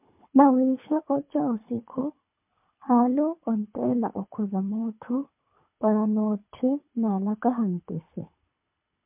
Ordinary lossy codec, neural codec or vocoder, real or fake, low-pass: MP3, 32 kbps; codec, 24 kHz, 3 kbps, HILCodec; fake; 3.6 kHz